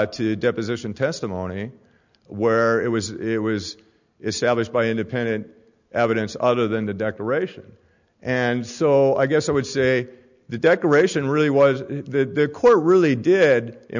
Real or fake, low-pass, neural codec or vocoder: real; 7.2 kHz; none